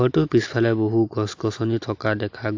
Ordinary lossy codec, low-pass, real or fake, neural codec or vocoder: AAC, 48 kbps; 7.2 kHz; real; none